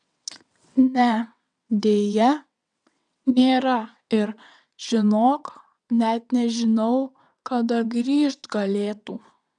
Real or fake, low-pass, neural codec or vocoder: fake; 9.9 kHz; vocoder, 22.05 kHz, 80 mel bands, WaveNeXt